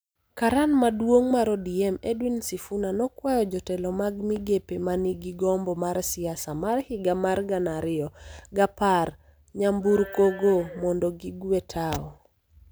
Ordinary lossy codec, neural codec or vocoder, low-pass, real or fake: none; none; none; real